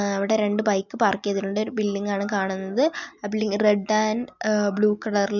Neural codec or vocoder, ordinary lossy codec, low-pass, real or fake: none; none; 7.2 kHz; real